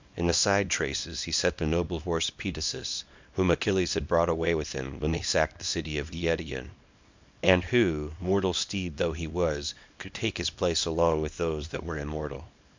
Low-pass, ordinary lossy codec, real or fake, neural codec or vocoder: 7.2 kHz; MP3, 64 kbps; fake; codec, 24 kHz, 0.9 kbps, WavTokenizer, small release